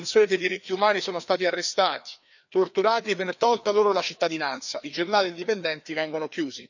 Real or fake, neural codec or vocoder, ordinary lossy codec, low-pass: fake; codec, 16 kHz, 2 kbps, FreqCodec, larger model; none; 7.2 kHz